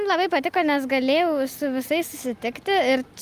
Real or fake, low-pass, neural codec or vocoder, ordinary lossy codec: real; 14.4 kHz; none; Opus, 32 kbps